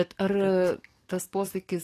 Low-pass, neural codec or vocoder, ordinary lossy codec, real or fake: 14.4 kHz; codec, 44.1 kHz, 7.8 kbps, DAC; AAC, 48 kbps; fake